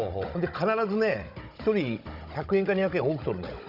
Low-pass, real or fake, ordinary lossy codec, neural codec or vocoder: 5.4 kHz; fake; none; codec, 16 kHz, 16 kbps, FunCodec, trained on Chinese and English, 50 frames a second